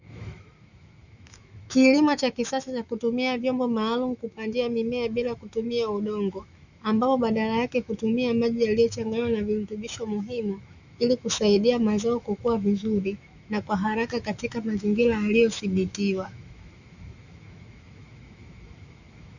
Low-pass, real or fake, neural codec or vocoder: 7.2 kHz; fake; autoencoder, 48 kHz, 128 numbers a frame, DAC-VAE, trained on Japanese speech